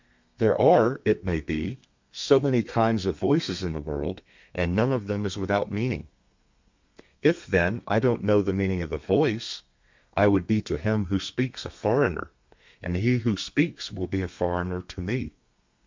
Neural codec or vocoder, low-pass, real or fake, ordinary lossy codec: codec, 32 kHz, 1.9 kbps, SNAC; 7.2 kHz; fake; MP3, 64 kbps